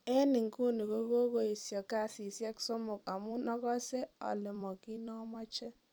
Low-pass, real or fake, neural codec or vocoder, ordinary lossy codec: none; fake; vocoder, 44.1 kHz, 128 mel bands every 256 samples, BigVGAN v2; none